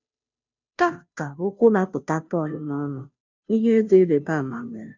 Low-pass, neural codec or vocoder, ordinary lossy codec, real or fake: 7.2 kHz; codec, 16 kHz, 0.5 kbps, FunCodec, trained on Chinese and English, 25 frames a second; none; fake